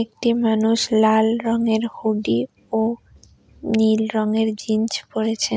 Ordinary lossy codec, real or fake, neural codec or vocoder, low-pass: none; real; none; none